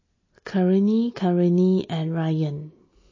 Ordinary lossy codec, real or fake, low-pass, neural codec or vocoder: MP3, 32 kbps; real; 7.2 kHz; none